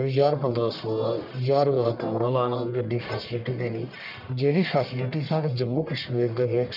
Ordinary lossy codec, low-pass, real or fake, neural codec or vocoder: none; 5.4 kHz; fake; codec, 44.1 kHz, 1.7 kbps, Pupu-Codec